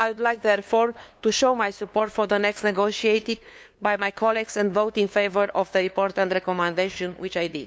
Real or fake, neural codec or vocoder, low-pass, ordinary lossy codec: fake; codec, 16 kHz, 2 kbps, FunCodec, trained on LibriTTS, 25 frames a second; none; none